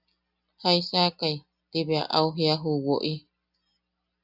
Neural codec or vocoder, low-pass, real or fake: none; 5.4 kHz; real